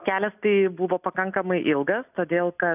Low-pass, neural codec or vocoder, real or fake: 3.6 kHz; none; real